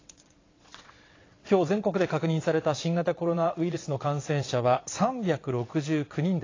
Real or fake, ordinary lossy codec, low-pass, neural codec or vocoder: fake; AAC, 32 kbps; 7.2 kHz; vocoder, 22.05 kHz, 80 mel bands, WaveNeXt